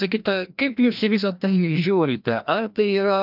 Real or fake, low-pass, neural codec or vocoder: fake; 5.4 kHz; codec, 16 kHz, 1 kbps, FreqCodec, larger model